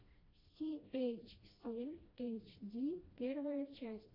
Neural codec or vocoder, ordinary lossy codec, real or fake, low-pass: codec, 16 kHz, 1 kbps, FreqCodec, smaller model; AAC, 24 kbps; fake; 5.4 kHz